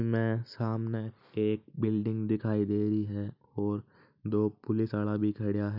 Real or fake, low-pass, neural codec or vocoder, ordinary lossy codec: real; 5.4 kHz; none; none